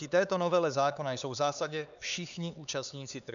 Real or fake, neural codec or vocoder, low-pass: fake; codec, 16 kHz, 4 kbps, X-Codec, HuBERT features, trained on LibriSpeech; 7.2 kHz